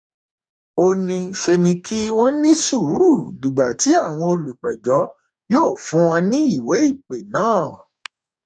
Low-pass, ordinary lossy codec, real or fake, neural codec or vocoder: 9.9 kHz; none; fake; codec, 44.1 kHz, 2.6 kbps, DAC